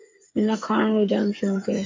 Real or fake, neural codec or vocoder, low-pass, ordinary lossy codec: fake; codec, 16 kHz, 8 kbps, FreqCodec, smaller model; 7.2 kHz; MP3, 48 kbps